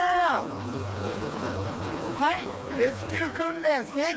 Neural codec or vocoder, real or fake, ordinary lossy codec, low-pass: codec, 16 kHz, 2 kbps, FreqCodec, smaller model; fake; none; none